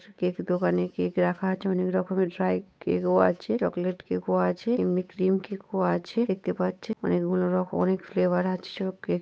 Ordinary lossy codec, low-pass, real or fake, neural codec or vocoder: none; none; fake; codec, 16 kHz, 8 kbps, FunCodec, trained on Chinese and English, 25 frames a second